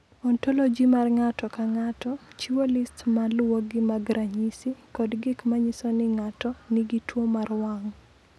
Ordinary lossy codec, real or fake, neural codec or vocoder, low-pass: none; real; none; none